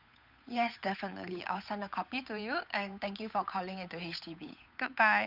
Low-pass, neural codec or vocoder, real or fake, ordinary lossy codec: 5.4 kHz; codec, 16 kHz, 8 kbps, FunCodec, trained on Chinese and English, 25 frames a second; fake; none